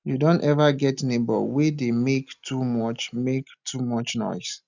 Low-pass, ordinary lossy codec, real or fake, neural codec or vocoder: 7.2 kHz; none; real; none